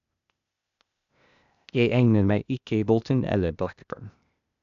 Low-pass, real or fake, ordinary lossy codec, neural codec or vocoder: 7.2 kHz; fake; none; codec, 16 kHz, 0.8 kbps, ZipCodec